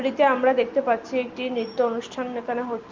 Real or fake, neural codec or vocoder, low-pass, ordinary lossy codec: real; none; 7.2 kHz; Opus, 32 kbps